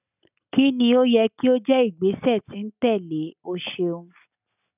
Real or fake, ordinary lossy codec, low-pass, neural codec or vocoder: real; none; 3.6 kHz; none